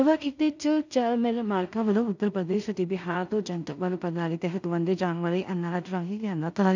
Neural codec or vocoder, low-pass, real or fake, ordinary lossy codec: codec, 16 kHz in and 24 kHz out, 0.4 kbps, LongCat-Audio-Codec, two codebook decoder; 7.2 kHz; fake; none